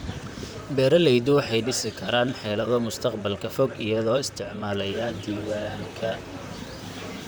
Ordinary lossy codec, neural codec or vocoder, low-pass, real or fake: none; vocoder, 44.1 kHz, 128 mel bands, Pupu-Vocoder; none; fake